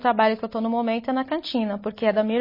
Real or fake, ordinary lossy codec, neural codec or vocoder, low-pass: real; MP3, 24 kbps; none; 5.4 kHz